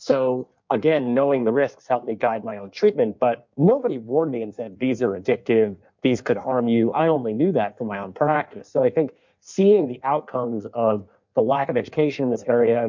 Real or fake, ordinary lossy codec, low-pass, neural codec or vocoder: fake; MP3, 64 kbps; 7.2 kHz; codec, 16 kHz in and 24 kHz out, 1.1 kbps, FireRedTTS-2 codec